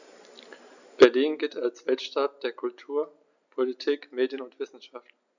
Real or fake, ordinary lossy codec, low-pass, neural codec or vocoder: real; none; 7.2 kHz; none